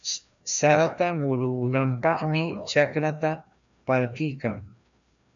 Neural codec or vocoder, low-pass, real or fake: codec, 16 kHz, 1 kbps, FreqCodec, larger model; 7.2 kHz; fake